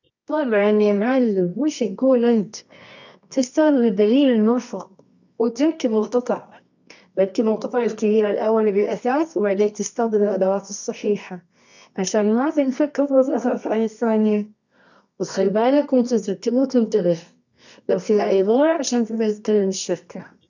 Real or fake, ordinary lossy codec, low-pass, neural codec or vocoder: fake; none; 7.2 kHz; codec, 24 kHz, 0.9 kbps, WavTokenizer, medium music audio release